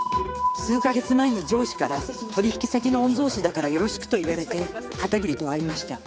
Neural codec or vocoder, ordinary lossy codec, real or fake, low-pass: codec, 16 kHz, 4 kbps, X-Codec, HuBERT features, trained on general audio; none; fake; none